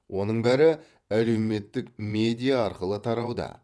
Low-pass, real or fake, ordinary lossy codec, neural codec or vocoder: none; fake; none; vocoder, 22.05 kHz, 80 mel bands, WaveNeXt